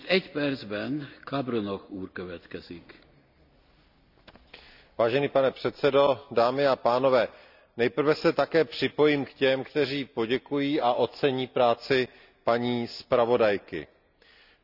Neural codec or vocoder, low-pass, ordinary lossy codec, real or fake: none; 5.4 kHz; none; real